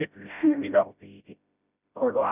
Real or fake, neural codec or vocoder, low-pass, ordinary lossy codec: fake; codec, 16 kHz, 0.5 kbps, FreqCodec, smaller model; 3.6 kHz; none